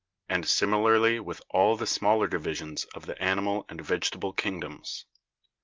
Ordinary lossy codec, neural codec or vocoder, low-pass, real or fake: Opus, 24 kbps; none; 7.2 kHz; real